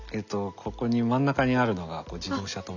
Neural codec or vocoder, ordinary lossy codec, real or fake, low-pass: none; none; real; 7.2 kHz